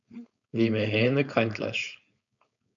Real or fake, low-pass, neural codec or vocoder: fake; 7.2 kHz; codec, 16 kHz, 4.8 kbps, FACodec